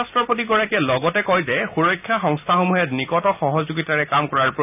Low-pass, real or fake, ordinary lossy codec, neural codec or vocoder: 3.6 kHz; real; none; none